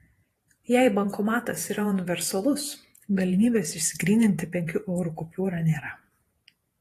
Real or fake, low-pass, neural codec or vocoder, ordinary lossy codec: fake; 14.4 kHz; vocoder, 48 kHz, 128 mel bands, Vocos; AAC, 48 kbps